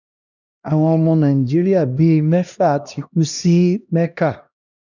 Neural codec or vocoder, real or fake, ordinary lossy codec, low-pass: codec, 16 kHz, 1 kbps, X-Codec, HuBERT features, trained on LibriSpeech; fake; none; 7.2 kHz